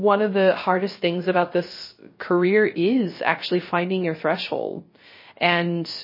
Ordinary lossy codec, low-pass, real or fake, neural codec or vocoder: MP3, 24 kbps; 5.4 kHz; fake; codec, 16 kHz, 0.3 kbps, FocalCodec